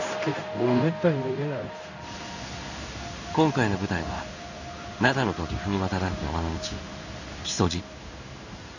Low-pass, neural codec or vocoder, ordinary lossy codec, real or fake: 7.2 kHz; codec, 16 kHz in and 24 kHz out, 1 kbps, XY-Tokenizer; none; fake